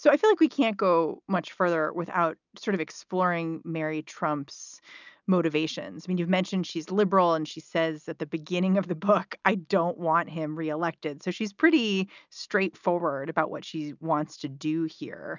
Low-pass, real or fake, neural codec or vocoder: 7.2 kHz; real; none